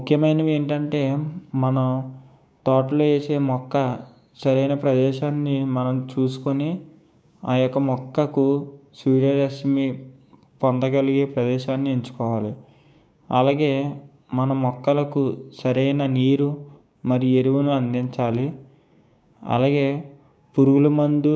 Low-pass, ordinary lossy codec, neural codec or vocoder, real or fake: none; none; codec, 16 kHz, 6 kbps, DAC; fake